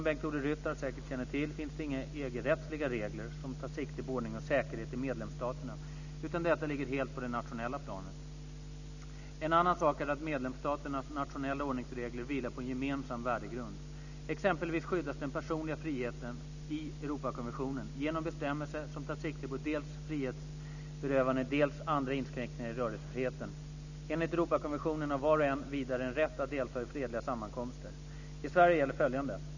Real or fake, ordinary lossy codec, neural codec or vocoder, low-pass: real; none; none; 7.2 kHz